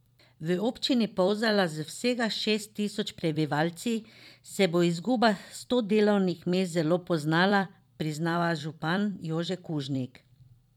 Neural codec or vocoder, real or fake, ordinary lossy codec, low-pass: vocoder, 44.1 kHz, 128 mel bands every 256 samples, BigVGAN v2; fake; none; 19.8 kHz